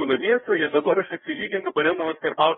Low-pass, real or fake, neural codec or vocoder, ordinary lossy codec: 7.2 kHz; fake; codec, 16 kHz, 1 kbps, FreqCodec, larger model; AAC, 16 kbps